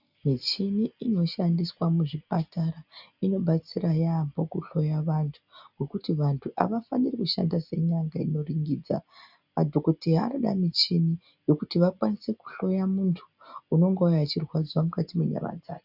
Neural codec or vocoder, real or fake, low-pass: none; real; 5.4 kHz